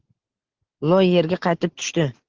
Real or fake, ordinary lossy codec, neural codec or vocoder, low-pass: real; Opus, 16 kbps; none; 7.2 kHz